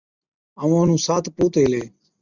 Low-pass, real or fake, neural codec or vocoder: 7.2 kHz; real; none